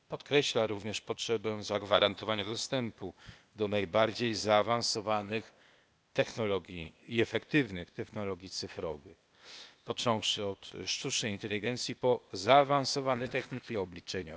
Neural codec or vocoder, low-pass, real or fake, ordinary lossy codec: codec, 16 kHz, 0.8 kbps, ZipCodec; none; fake; none